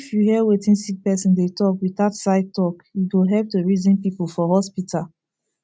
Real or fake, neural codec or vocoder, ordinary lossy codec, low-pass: real; none; none; none